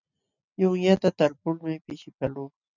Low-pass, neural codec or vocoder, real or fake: 7.2 kHz; none; real